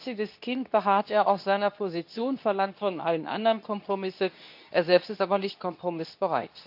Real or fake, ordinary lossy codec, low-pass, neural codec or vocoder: fake; none; 5.4 kHz; codec, 24 kHz, 0.9 kbps, WavTokenizer, medium speech release version 2